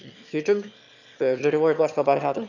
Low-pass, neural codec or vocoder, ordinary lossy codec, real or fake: 7.2 kHz; autoencoder, 22.05 kHz, a latent of 192 numbers a frame, VITS, trained on one speaker; none; fake